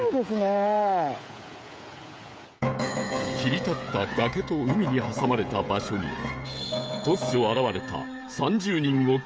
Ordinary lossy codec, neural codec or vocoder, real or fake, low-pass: none; codec, 16 kHz, 16 kbps, FreqCodec, smaller model; fake; none